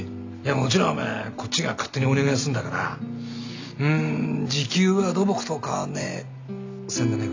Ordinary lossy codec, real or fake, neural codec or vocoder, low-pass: AAC, 48 kbps; real; none; 7.2 kHz